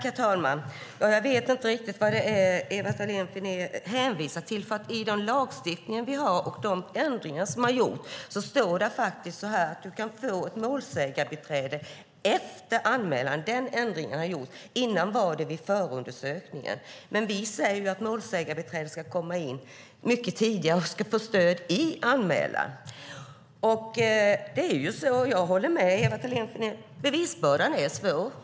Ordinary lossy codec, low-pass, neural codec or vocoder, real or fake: none; none; none; real